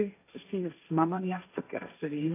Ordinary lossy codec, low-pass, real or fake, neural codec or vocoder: AAC, 24 kbps; 3.6 kHz; fake; codec, 16 kHz, 1.1 kbps, Voila-Tokenizer